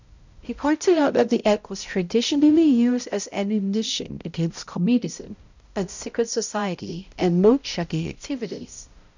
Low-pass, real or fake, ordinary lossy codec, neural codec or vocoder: 7.2 kHz; fake; none; codec, 16 kHz, 0.5 kbps, X-Codec, HuBERT features, trained on balanced general audio